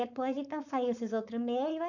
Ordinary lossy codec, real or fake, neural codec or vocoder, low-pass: none; fake; codec, 16 kHz, 4.8 kbps, FACodec; 7.2 kHz